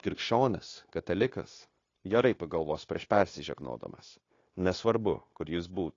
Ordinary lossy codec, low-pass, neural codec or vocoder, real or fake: AAC, 32 kbps; 7.2 kHz; codec, 16 kHz, 0.9 kbps, LongCat-Audio-Codec; fake